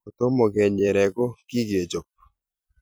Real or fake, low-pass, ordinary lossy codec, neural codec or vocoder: fake; none; none; vocoder, 44.1 kHz, 128 mel bands every 256 samples, BigVGAN v2